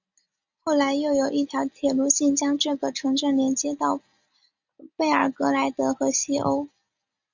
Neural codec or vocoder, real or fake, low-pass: none; real; 7.2 kHz